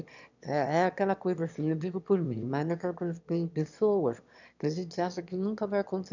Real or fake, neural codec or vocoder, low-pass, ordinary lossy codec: fake; autoencoder, 22.05 kHz, a latent of 192 numbers a frame, VITS, trained on one speaker; 7.2 kHz; none